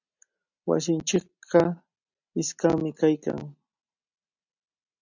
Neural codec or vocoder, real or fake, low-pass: none; real; 7.2 kHz